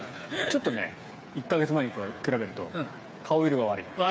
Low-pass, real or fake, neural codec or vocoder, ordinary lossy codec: none; fake; codec, 16 kHz, 8 kbps, FreqCodec, smaller model; none